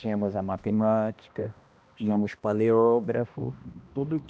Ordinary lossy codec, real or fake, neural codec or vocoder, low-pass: none; fake; codec, 16 kHz, 1 kbps, X-Codec, HuBERT features, trained on balanced general audio; none